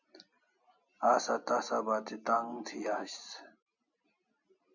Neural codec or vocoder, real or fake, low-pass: none; real; 7.2 kHz